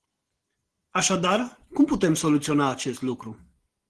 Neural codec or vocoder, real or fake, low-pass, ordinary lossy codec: none; real; 10.8 kHz; Opus, 16 kbps